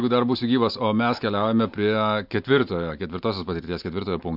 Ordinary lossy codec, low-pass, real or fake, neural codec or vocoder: MP3, 48 kbps; 5.4 kHz; real; none